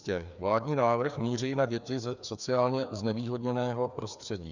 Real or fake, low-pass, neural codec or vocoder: fake; 7.2 kHz; codec, 16 kHz, 2 kbps, FreqCodec, larger model